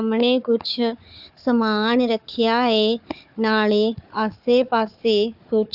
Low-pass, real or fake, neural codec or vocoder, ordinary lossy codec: 5.4 kHz; fake; codec, 16 kHz, 4 kbps, FunCodec, trained on Chinese and English, 50 frames a second; AAC, 48 kbps